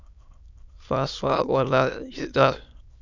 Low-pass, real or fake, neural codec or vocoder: 7.2 kHz; fake; autoencoder, 22.05 kHz, a latent of 192 numbers a frame, VITS, trained on many speakers